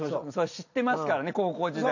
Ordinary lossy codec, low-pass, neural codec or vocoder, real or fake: none; 7.2 kHz; none; real